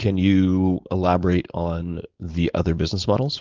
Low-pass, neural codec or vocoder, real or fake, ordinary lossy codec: 7.2 kHz; codec, 16 kHz, 4.8 kbps, FACodec; fake; Opus, 32 kbps